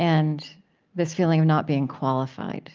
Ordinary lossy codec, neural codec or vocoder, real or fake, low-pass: Opus, 32 kbps; none; real; 7.2 kHz